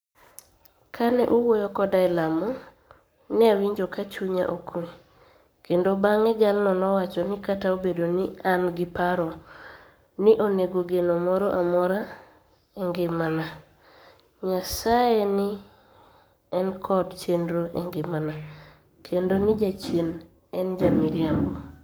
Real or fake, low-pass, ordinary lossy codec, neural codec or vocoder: fake; none; none; codec, 44.1 kHz, 7.8 kbps, Pupu-Codec